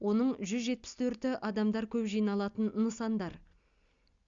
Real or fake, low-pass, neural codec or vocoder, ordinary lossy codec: real; 7.2 kHz; none; Opus, 64 kbps